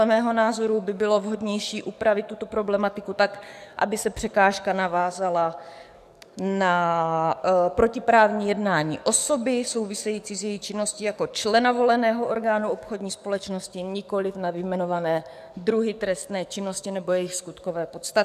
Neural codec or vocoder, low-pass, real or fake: codec, 44.1 kHz, 7.8 kbps, DAC; 14.4 kHz; fake